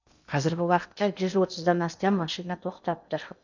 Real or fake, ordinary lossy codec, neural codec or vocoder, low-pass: fake; none; codec, 16 kHz in and 24 kHz out, 0.8 kbps, FocalCodec, streaming, 65536 codes; 7.2 kHz